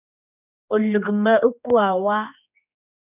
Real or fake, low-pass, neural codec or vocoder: fake; 3.6 kHz; codec, 16 kHz, 2 kbps, X-Codec, HuBERT features, trained on general audio